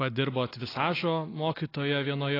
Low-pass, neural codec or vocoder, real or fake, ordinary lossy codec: 5.4 kHz; none; real; AAC, 24 kbps